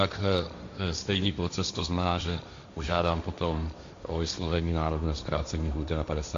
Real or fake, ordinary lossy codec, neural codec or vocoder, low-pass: fake; AAC, 64 kbps; codec, 16 kHz, 1.1 kbps, Voila-Tokenizer; 7.2 kHz